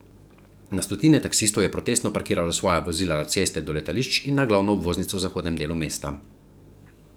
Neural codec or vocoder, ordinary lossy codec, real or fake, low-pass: codec, 44.1 kHz, 7.8 kbps, DAC; none; fake; none